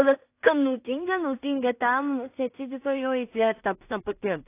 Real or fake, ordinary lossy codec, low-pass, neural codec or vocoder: fake; AAC, 24 kbps; 3.6 kHz; codec, 16 kHz in and 24 kHz out, 0.4 kbps, LongCat-Audio-Codec, two codebook decoder